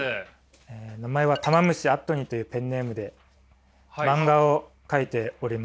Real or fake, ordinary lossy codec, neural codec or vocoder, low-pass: real; none; none; none